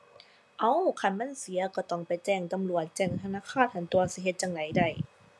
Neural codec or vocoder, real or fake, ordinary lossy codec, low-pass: none; real; none; none